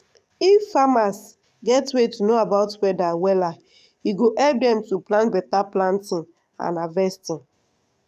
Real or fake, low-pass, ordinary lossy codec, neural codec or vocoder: fake; 14.4 kHz; none; codec, 44.1 kHz, 7.8 kbps, DAC